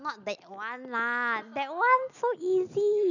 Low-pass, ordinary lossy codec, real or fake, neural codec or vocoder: 7.2 kHz; none; real; none